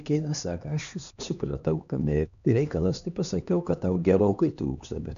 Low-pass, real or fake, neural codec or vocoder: 7.2 kHz; fake; codec, 16 kHz, 2 kbps, X-Codec, HuBERT features, trained on LibriSpeech